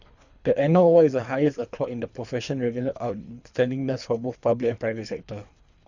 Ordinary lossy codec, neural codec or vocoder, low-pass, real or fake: none; codec, 24 kHz, 3 kbps, HILCodec; 7.2 kHz; fake